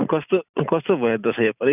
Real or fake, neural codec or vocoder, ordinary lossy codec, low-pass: real; none; none; 3.6 kHz